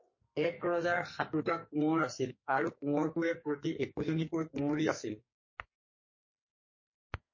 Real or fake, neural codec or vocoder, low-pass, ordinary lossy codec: fake; codec, 44.1 kHz, 2.6 kbps, SNAC; 7.2 kHz; MP3, 32 kbps